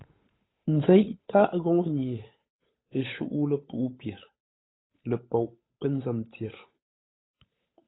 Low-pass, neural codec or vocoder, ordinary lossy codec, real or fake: 7.2 kHz; codec, 16 kHz, 8 kbps, FunCodec, trained on Chinese and English, 25 frames a second; AAC, 16 kbps; fake